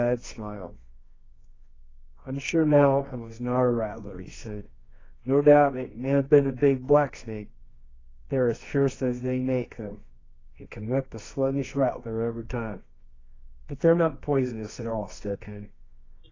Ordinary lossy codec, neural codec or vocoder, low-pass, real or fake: AAC, 32 kbps; codec, 24 kHz, 0.9 kbps, WavTokenizer, medium music audio release; 7.2 kHz; fake